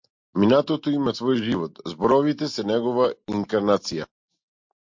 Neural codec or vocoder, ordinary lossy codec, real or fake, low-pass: none; MP3, 48 kbps; real; 7.2 kHz